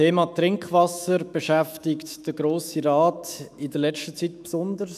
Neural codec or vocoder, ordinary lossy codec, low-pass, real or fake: autoencoder, 48 kHz, 128 numbers a frame, DAC-VAE, trained on Japanese speech; none; 14.4 kHz; fake